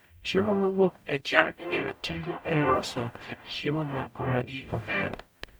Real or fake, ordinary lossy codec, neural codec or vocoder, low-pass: fake; none; codec, 44.1 kHz, 0.9 kbps, DAC; none